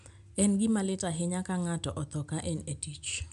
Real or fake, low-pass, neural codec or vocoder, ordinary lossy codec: real; 10.8 kHz; none; none